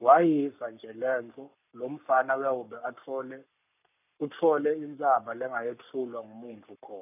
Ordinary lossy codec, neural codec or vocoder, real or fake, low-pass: none; codec, 44.1 kHz, 7.8 kbps, Pupu-Codec; fake; 3.6 kHz